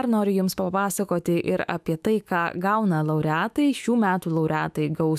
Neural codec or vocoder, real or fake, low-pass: autoencoder, 48 kHz, 128 numbers a frame, DAC-VAE, trained on Japanese speech; fake; 14.4 kHz